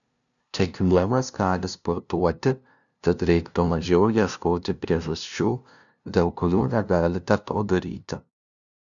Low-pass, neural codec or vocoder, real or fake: 7.2 kHz; codec, 16 kHz, 0.5 kbps, FunCodec, trained on LibriTTS, 25 frames a second; fake